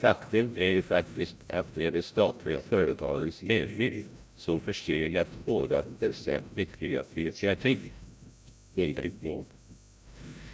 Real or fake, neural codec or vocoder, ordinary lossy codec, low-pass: fake; codec, 16 kHz, 0.5 kbps, FreqCodec, larger model; none; none